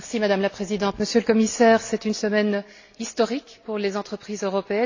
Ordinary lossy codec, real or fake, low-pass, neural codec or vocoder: AAC, 48 kbps; real; 7.2 kHz; none